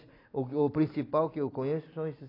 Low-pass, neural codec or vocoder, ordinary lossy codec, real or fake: 5.4 kHz; none; AAC, 24 kbps; real